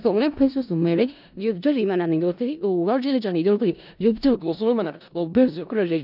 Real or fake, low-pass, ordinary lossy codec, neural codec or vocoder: fake; 5.4 kHz; none; codec, 16 kHz in and 24 kHz out, 0.4 kbps, LongCat-Audio-Codec, four codebook decoder